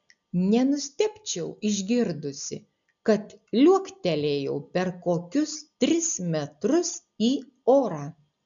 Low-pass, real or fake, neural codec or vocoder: 7.2 kHz; real; none